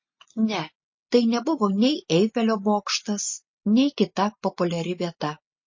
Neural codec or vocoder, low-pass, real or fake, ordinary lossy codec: none; 7.2 kHz; real; MP3, 32 kbps